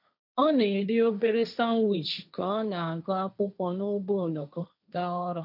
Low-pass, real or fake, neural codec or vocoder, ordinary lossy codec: 5.4 kHz; fake; codec, 16 kHz, 1.1 kbps, Voila-Tokenizer; none